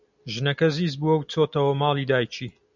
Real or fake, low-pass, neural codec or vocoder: real; 7.2 kHz; none